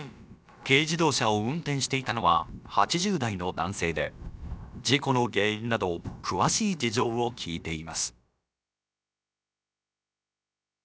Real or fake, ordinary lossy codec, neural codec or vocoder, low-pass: fake; none; codec, 16 kHz, about 1 kbps, DyCAST, with the encoder's durations; none